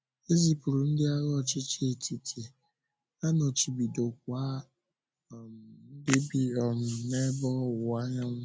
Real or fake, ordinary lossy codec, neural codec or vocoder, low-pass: real; none; none; none